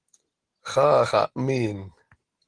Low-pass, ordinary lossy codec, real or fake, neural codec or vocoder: 9.9 kHz; Opus, 16 kbps; real; none